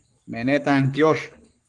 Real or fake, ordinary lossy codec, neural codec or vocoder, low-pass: fake; Opus, 24 kbps; codec, 44.1 kHz, 7.8 kbps, Pupu-Codec; 10.8 kHz